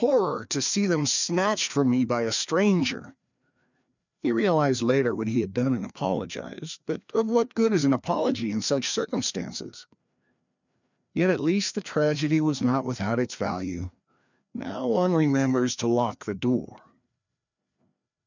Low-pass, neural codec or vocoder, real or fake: 7.2 kHz; codec, 16 kHz, 2 kbps, FreqCodec, larger model; fake